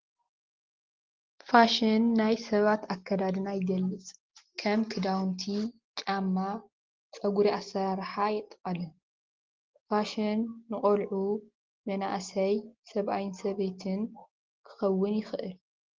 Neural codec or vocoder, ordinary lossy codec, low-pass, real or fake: none; Opus, 16 kbps; 7.2 kHz; real